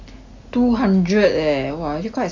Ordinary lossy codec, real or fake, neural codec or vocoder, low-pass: MP3, 48 kbps; real; none; 7.2 kHz